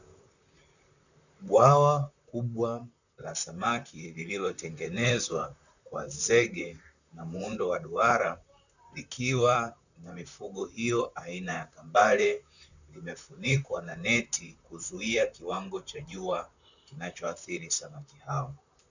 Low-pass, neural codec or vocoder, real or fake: 7.2 kHz; vocoder, 44.1 kHz, 128 mel bands, Pupu-Vocoder; fake